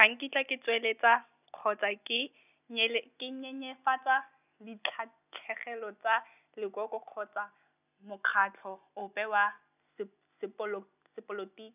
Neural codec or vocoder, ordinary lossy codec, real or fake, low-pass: none; none; real; 3.6 kHz